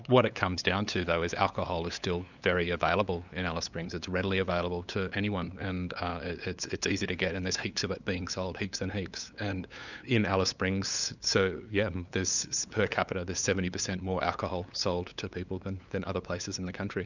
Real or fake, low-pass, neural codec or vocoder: fake; 7.2 kHz; codec, 16 kHz, 8 kbps, FunCodec, trained on LibriTTS, 25 frames a second